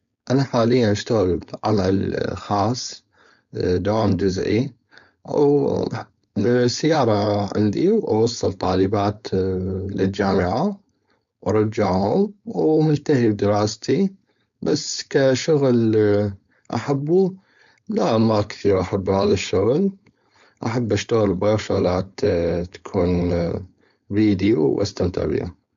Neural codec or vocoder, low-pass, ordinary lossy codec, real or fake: codec, 16 kHz, 4.8 kbps, FACodec; 7.2 kHz; MP3, 64 kbps; fake